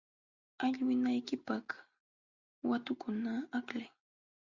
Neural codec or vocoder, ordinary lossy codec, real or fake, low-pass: none; Opus, 64 kbps; real; 7.2 kHz